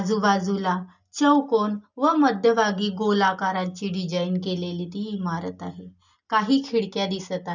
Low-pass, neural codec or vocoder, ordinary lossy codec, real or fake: 7.2 kHz; none; none; real